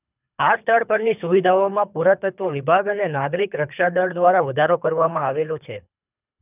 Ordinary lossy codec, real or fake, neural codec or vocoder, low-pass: none; fake; codec, 24 kHz, 3 kbps, HILCodec; 3.6 kHz